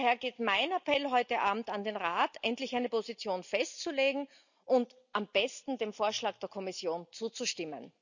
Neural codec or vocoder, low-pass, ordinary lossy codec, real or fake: none; 7.2 kHz; none; real